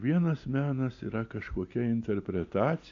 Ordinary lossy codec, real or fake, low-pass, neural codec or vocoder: AAC, 48 kbps; real; 7.2 kHz; none